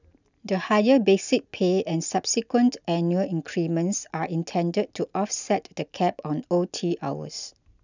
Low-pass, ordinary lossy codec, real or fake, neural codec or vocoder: 7.2 kHz; none; real; none